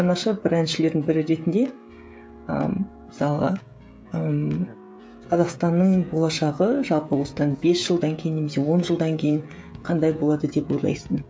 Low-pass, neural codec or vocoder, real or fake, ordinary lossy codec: none; codec, 16 kHz, 16 kbps, FreqCodec, smaller model; fake; none